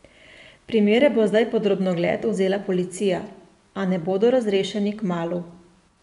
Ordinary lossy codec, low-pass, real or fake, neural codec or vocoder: none; 10.8 kHz; fake; vocoder, 24 kHz, 100 mel bands, Vocos